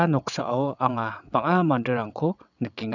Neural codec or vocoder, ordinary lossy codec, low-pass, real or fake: vocoder, 22.05 kHz, 80 mel bands, WaveNeXt; none; 7.2 kHz; fake